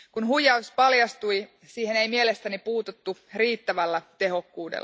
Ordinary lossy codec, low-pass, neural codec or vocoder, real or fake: none; none; none; real